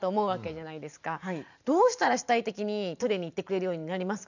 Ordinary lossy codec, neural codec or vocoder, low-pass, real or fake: none; vocoder, 44.1 kHz, 128 mel bands every 512 samples, BigVGAN v2; 7.2 kHz; fake